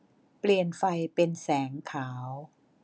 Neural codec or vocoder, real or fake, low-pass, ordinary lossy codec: none; real; none; none